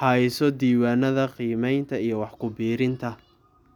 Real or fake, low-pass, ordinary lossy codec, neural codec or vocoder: real; 19.8 kHz; none; none